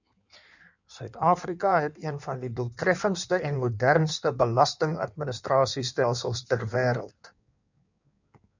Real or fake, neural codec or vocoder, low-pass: fake; codec, 16 kHz in and 24 kHz out, 1.1 kbps, FireRedTTS-2 codec; 7.2 kHz